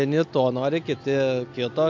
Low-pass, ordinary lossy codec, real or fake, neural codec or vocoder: 7.2 kHz; AAC, 48 kbps; real; none